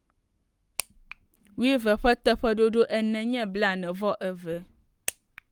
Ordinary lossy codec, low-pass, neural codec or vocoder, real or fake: Opus, 32 kbps; 19.8 kHz; codec, 44.1 kHz, 7.8 kbps, Pupu-Codec; fake